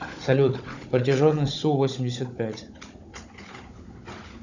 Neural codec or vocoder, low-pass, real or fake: codec, 16 kHz, 16 kbps, FunCodec, trained on Chinese and English, 50 frames a second; 7.2 kHz; fake